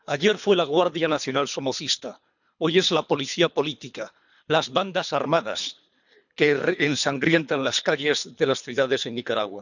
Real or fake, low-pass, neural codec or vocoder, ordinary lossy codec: fake; 7.2 kHz; codec, 24 kHz, 3 kbps, HILCodec; none